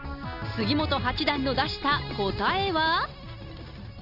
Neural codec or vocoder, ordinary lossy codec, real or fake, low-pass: none; none; real; 5.4 kHz